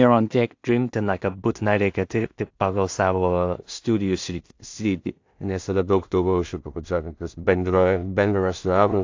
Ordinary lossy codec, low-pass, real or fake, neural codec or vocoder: AAC, 48 kbps; 7.2 kHz; fake; codec, 16 kHz in and 24 kHz out, 0.4 kbps, LongCat-Audio-Codec, two codebook decoder